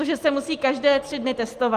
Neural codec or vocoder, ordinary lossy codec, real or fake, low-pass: none; Opus, 24 kbps; real; 14.4 kHz